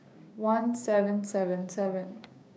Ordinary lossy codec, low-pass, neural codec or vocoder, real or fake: none; none; codec, 16 kHz, 6 kbps, DAC; fake